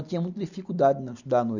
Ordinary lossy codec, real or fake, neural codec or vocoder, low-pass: none; real; none; 7.2 kHz